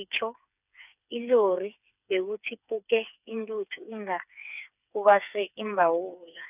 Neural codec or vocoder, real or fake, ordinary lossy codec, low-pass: codec, 16 kHz, 4 kbps, FreqCodec, smaller model; fake; none; 3.6 kHz